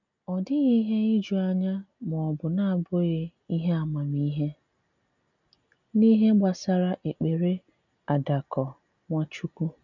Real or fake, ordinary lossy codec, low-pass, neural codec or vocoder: real; none; 7.2 kHz; none